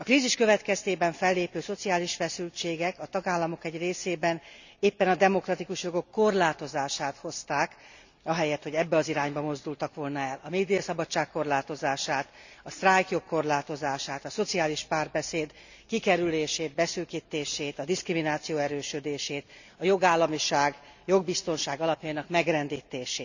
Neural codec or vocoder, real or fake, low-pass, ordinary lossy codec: none; real; 7.2 kHz; none